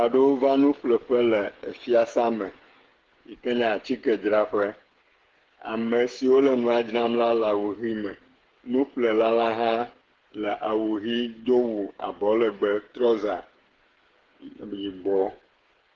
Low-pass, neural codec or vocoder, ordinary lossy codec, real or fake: 7.2 kHz; codec, 16 kHz, 8 kbps, FreqCodec, smaller model; Opus, 16 kbps; fake